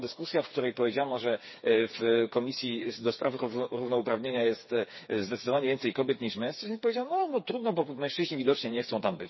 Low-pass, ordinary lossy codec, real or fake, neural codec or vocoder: 7.2 kHz; MP3, 24 kbps; fake; codec, 16 kHz, 4 kbps, FreqCodec, smaller model